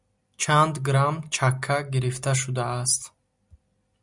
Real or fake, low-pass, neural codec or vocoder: real; 10.8 kHz; none